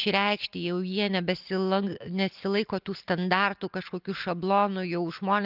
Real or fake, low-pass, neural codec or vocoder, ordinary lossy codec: real; 5.4 kHz; none; Opus, 24 kbps